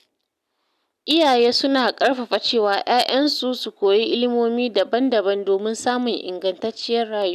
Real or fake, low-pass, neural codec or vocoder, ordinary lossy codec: real; 14.4 kHz; none; none